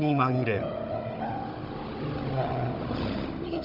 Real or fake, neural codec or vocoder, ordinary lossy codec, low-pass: fake; codec, 16 kHz, 16 kbps, FunCodec, trained on Chinese and English, 50 frames a second; Opus, 64 kbps; 5.4 kHz